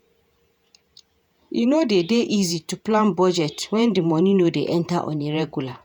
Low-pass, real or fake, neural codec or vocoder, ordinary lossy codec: 19.8 kHz; fake; vocoder, 48 kHz, 128 mel bands, Vocos; none